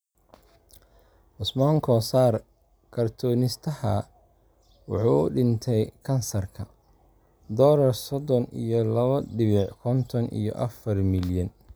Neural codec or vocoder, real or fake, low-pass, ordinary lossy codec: none; real; none; none